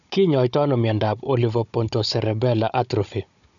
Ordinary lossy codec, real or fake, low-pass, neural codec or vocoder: MP3, 96 kbps; fake; 7.2 kHz; codec, 16 kHz, 16 kbps, FunCodec, trained on Chinese and English, 50 frames a second